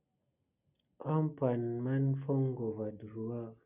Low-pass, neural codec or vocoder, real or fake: 3.6 kHz; none; real